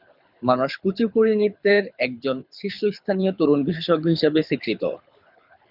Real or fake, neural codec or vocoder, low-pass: fake; codec, 24 kHz, 6 kbps, HILCodec; 5.4 kHz